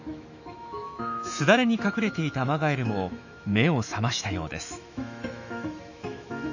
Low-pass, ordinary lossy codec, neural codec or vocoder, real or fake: 7.2 kHz; none; autoencoder, 48 kHz, 128 numbers a frame, DAC-VAE, trained on Japanese speech; fake